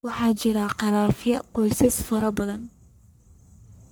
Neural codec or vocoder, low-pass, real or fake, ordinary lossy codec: codec, 44.1 kHz, 1.7 kbps, Pupu-Codec; none; fake; none